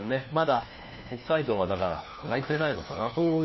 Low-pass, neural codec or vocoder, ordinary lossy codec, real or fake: 7.2 kHz; codec, 16 kHz, 1 kbps, FunCodec, trained on LibriTTS, 50 frames a second; MP3, 24 kbps; fake